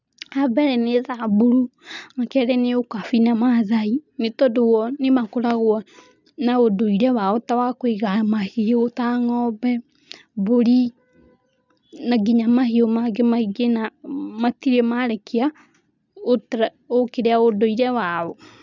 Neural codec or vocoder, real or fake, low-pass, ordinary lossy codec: none; real; 7.2 kHz; none